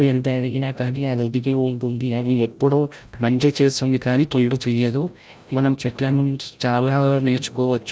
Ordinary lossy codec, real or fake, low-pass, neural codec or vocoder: none; fake; none; codec, 16 kHz, 0.5 kbps, FreqCodec, larger model